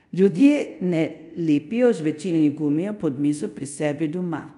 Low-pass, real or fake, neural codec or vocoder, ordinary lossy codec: 10.8 kHz; fake; codec, 24 kHz, 0.5 kbps, DualCodec; none